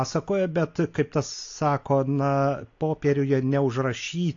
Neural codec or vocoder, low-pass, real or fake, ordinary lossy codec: none; 7.2 kHz; real; AAC, 48 kbps